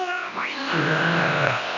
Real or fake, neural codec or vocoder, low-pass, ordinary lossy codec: fake; codec, 24 kHz, 0.9 kbps, WavTokenizer, large speech release; 7.2 kHz; none